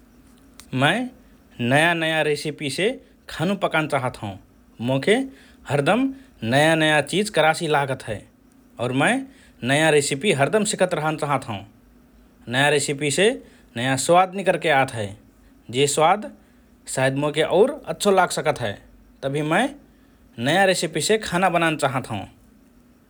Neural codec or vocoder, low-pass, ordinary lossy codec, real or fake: none; none; none; real